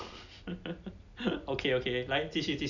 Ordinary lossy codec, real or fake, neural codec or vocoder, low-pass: none; real; none; 7.2 kHz